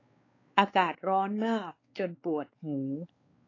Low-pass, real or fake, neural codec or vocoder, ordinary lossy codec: 7.2 kHz; fake; codec, 16 kHz, 4 kbps, X-Codec, WavLM features, trained on Multilingual LibriSpeech; AAC, 32 kbps